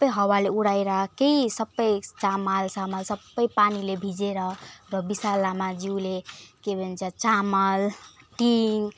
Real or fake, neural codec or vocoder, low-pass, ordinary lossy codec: real; none; none; none